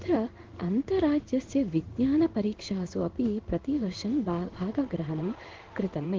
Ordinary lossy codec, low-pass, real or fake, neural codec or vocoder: Opus, 16 kbps; 7.2 kHz; fake; codec, 16 kHz in and 24 kHz out, 1 kbps, XY-Tokenizer